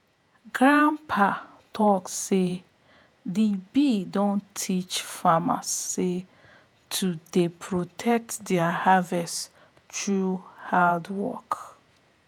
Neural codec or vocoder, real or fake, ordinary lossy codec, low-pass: vocoder, 48 kHz, 128 mel bands, Vocos; fake; none; none